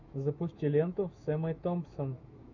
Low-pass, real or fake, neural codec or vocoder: 7.2 kHz; fake; autoencoder, 48 kHz, 128 numbers a frame, DAC-VAE, trained on Japanese speech